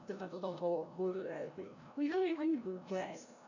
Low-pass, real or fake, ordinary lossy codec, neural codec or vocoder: 7.2 kHz; fake; none; codec, 16 kHz, 0.5 kbps, FreqCodec, larger model